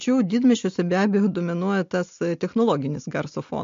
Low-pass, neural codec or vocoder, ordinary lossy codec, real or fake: 7.2 kHz; none; MP3, 48 kbps; real